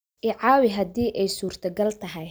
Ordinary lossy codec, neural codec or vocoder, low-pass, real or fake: none; none; none; real